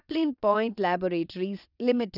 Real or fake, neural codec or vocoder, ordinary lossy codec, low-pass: fake; vocoder, 22.05 kHz, 80 mel bands, WaveNeXt; none; 5.4 kHz